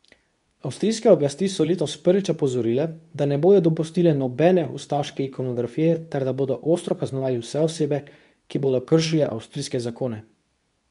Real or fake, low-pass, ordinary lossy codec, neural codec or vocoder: fake; 10.8 kHz; none; codec, 24 kHz, 0.9 kbps, WavTokenizer, medium speech release version 2